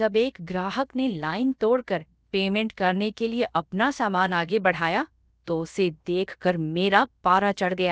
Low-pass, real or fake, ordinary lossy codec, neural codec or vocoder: none; fake; none; codec, 16 kHz, about 1 kbps, DyCAST, with the encoder's durations